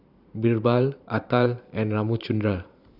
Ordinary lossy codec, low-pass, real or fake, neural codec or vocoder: none; 5.4 kHz; fake; vocoder, 44.1 kHz, 128 mel bands every 512 samples, BigVGAN v2